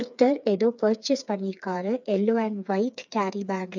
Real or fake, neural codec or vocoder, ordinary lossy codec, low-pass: fake; codec, 16 kHz, 4 kbps, FreqCodec, smaller model; none; 7.2 kHz